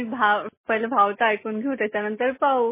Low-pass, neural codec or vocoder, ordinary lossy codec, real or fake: 3.6 kHz; none; MP3, 16 kbps; real